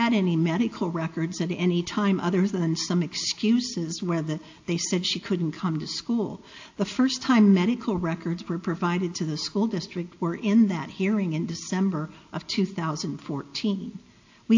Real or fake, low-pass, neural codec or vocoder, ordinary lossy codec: real; 7.2 kHz; none; AAC, 48 kbps